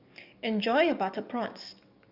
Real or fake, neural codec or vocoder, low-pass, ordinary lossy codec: fake; codec, 44.1 kHz, 7.8 kbps, DAC; 5.4 kHz; none